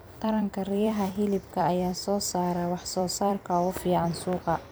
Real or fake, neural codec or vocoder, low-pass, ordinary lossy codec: fake; vocoder, 44.1 kHz, 128 mel bands every 256 samples, BigVGAN v2; none; none